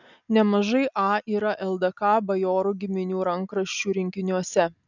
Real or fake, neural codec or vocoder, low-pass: real; none; 7.2 kHz